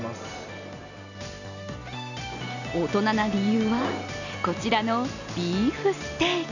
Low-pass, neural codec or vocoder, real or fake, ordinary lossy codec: 7.2 kHz; none; real; none